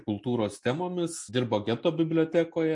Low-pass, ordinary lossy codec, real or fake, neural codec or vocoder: 10.8 kHz; MP3, 48 kbps; real; none